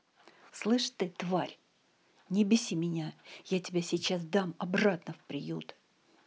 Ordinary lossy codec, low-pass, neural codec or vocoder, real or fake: none; none; none; real